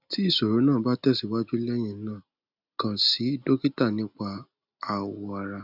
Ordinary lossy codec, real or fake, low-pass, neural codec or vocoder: none; real; 5.4 kHz; none